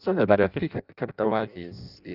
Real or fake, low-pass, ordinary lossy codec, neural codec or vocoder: fake; 5.4 kHz; none; codec, 16 kHz in and 24 kHz out, 0.6 kbps, FireRedTTS-2 codec